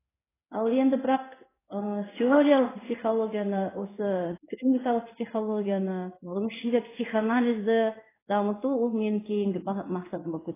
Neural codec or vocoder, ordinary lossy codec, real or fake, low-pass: codec, 16 kHz in and 24 kHz out, 1 kbps, XY-Tokenizer; AAC, 16 kbps; fake; 3.6 kHz